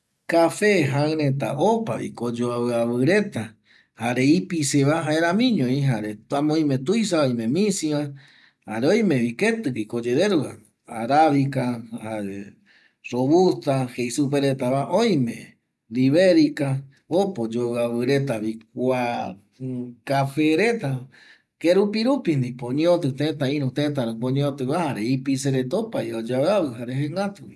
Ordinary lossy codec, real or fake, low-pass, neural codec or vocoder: none; real; none; none